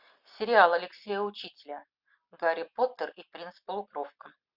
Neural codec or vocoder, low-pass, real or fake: none; 5.4 kHz; real